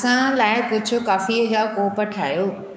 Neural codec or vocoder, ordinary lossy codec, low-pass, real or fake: codec, 16 kHz, 6 kbps, DAC; none; none; fake